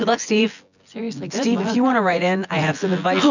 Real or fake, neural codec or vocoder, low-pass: fake; vocoder, 24 kHz, 100 mel bands, Vocos; 7.2 kHz